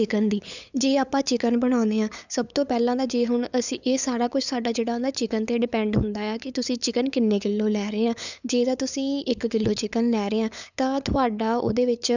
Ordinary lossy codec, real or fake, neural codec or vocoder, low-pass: none; fake; codec, 16 kHz, 8 kbps, FunCodec, trained on LibriTTS, 25 frames a second; 7.2 kHz